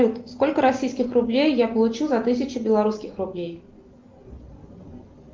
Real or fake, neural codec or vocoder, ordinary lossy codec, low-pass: real; none; Opus, 32 kbps; 7.2 kHz